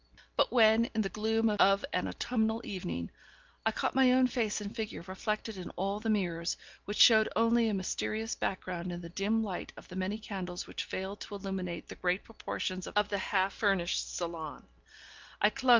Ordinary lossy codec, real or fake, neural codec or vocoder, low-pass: Opus, 32 kbps; real; none; 7.2 kHz